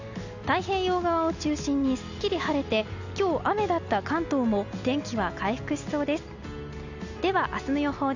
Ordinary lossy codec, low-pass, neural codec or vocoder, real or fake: none; 7.2 kHz; none; real